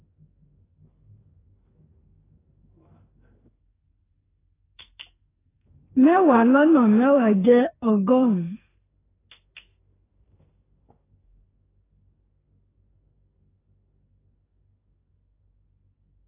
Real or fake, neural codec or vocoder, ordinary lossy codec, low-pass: fake; codec, 44.1 kHz, 2.6 kbps, SNAC; AAC, 16 kbps; 3.6 kHz